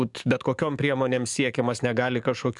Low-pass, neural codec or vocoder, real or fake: 10.8 kHz; codec, 44.1 kHz, 7.8 kbps, DAC; fake